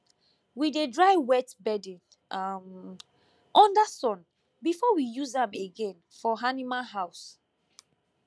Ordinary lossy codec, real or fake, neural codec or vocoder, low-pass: none; real; none; none